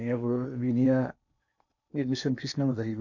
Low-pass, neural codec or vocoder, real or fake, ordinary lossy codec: 7.2 kHz; codec, 16 kHz in and 24 kHz out, 0.8 kbps, FocalCodec, streaming, 65536 codes; fake; none